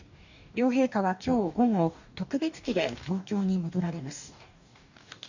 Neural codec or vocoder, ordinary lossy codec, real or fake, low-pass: codec, 44.1 kHz, 2.6 kbps, DAC; none; fake; 7.2 kHz